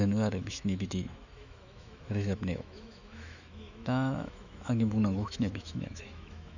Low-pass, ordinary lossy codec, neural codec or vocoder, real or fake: 7.2 kHz; none; autoencoder, 48 kHz, 128 numbers a frame, DAC-VAE, trained on Japanese speech; fake